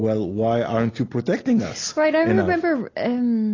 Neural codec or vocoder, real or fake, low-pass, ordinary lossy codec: none; real; 7.2 kHz; AAC, 32 kbps